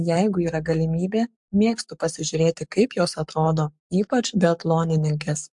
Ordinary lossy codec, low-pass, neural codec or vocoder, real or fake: MP3, 64 kbps; 10.8 kHz; codec, 44.1 kHz, 7.8 kbps, Pupu-Codec; fake